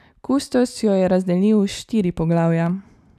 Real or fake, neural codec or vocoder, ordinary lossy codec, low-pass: real; none; none; 14.4 kHz